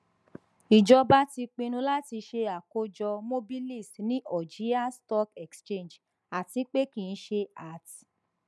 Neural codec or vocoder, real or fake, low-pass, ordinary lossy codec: none; real; none; none